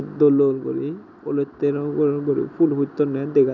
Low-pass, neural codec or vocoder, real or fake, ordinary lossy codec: 7.2 kHz; none; real; none